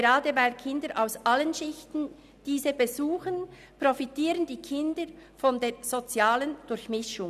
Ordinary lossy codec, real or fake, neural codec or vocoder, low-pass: none; real; none; 14.4 kHz